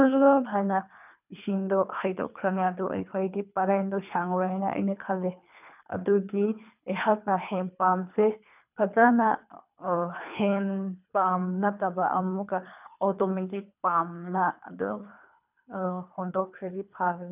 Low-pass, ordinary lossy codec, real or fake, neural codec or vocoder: 3.6 kHz; none; fake; codec, 24 kHz, 3 kbps, HILCodec